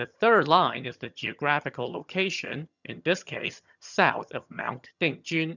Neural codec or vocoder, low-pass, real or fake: vocoder, 22.05 kHz, 80 mel bands, HiFi-GAN; 7.2 kHz; fake